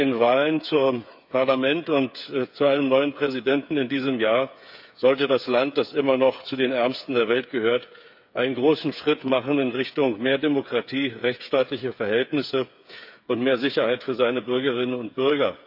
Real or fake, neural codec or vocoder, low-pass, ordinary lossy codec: fake; codec, 16 kHz, 8 kbps, FreqCodec, smaller model; 5.4 kHz; none